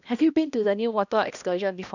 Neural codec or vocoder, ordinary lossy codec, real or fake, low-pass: codec, 16 kHz, 1 kbps, X-Codec, HuBERT features, trained on balanced general audio; none; fake; 7.2 kHz